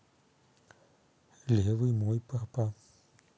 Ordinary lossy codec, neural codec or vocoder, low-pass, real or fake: none; none; none; real